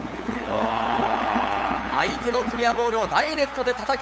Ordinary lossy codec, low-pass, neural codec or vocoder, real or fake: none; none; codec, 16 kHz, 8 kbps, FunCodec, trained on LibriTTS, 25 frames a second; fake